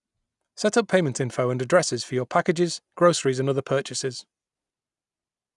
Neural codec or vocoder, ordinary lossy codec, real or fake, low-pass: none; none; real; 10.8 kHz